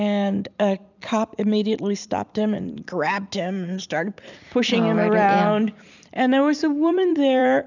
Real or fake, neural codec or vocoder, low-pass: fake; vocoder, 44.1 kHz, 128 mel bands every 256 samples, BigVGAN v2; 7.2 kHz